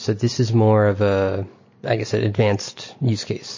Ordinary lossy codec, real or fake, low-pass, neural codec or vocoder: MP3, 32 kbps; real; 7.2 kHz; none